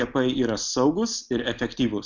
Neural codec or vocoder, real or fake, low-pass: none; real; 7.2 kHz